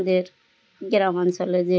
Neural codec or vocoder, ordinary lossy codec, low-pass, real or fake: none; none; none; real